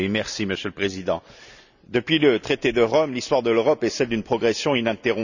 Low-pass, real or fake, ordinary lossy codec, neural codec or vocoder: 7.2 kHz; real; none; none